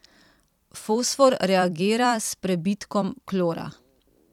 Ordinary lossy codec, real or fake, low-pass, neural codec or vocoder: none; fake; 19.8 kHz; vocoder, 44.1 kHz, 128 mel bands every 256 samples, BigVGAN v2